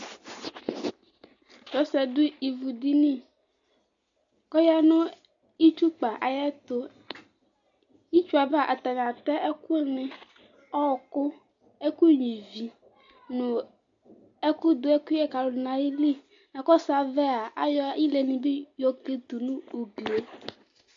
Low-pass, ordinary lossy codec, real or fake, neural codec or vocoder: 7.2 kHz; AAC, 64 kbps; real; none